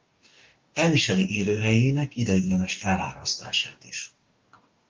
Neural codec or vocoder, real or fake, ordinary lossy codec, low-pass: codec, 44.1 kHz, 2.6 kbps, DAC; fake; Opus, 24 kbps; 7.2 kHz